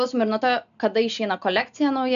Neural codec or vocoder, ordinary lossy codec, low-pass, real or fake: none; AAC, 96 kbps; 7.2 kHz; real